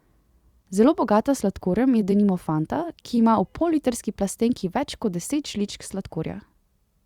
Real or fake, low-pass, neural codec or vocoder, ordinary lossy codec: fake; 19.8 kHz; vocoder, 44.1 kHz, 128 mel bands every 256 samples, BigVGAN v2; Opus, 64 kbps